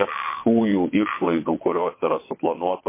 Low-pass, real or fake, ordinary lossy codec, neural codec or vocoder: 3.6 kHz; fake; MP3, 24 kbps; vocoder, 22.05 kHz, 80 mel bands, WaveNeXt